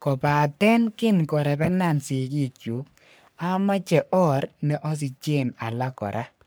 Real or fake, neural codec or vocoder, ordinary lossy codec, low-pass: fake; codec, 44.1 kHz, 3.4 kbps, Pupu-Codec; none; none